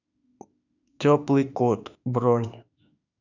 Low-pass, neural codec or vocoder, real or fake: 7.2 kHz; autoencoder, 48 kHz, 32 numbers a frame, DAC-VAE, trained on Japanese speech; fake